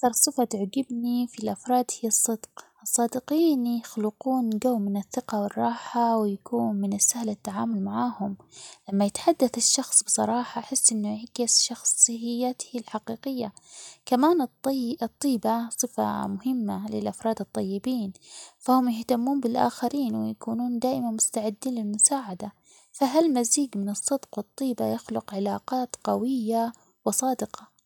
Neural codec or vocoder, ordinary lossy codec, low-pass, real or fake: none; none; 19.8 kHz; real